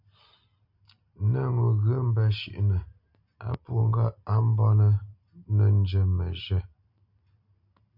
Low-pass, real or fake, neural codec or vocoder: 5.4 kHz; real; none